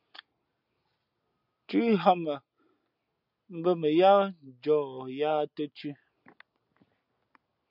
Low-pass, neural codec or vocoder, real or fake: 5.4 kHz; none; real